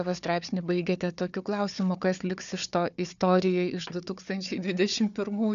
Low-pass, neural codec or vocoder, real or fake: 7.2 kHz; codec, 16 kHz, 4 kbps, FunCodec, trained on Chinese and English, 50 frames a second; fake